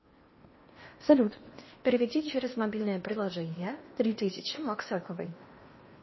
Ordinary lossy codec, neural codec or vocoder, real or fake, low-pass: MP3, 24 kbps; codec, 16 kHz in and 24 kHz out, 0.8 kbps, FocalCodec, streaming, 65536 codes; fake; 7.2 kHz